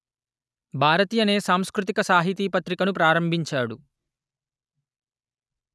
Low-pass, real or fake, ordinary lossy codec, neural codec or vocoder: none; real; none; none